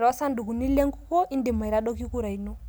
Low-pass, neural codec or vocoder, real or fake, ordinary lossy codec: none; none; real; none